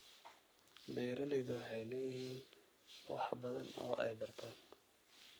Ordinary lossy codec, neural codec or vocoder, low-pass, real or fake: none; codec, 44.1 kHz, 3.4 kbps, Pupu-Codec; none; fake